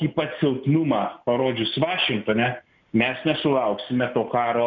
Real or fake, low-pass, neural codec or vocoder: real; 7.2 kHz; none